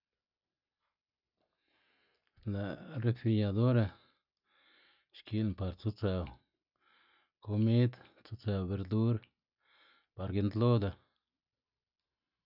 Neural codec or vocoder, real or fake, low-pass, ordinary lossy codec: none; real; 5.4 kHz; none